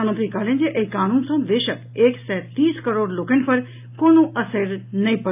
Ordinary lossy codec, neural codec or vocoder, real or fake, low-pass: AAC, 32 kbps; none; real; 3.6 kHz